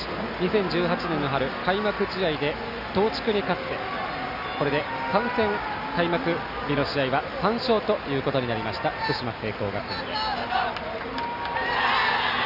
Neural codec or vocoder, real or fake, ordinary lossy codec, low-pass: none; real; none; 5.4 kHz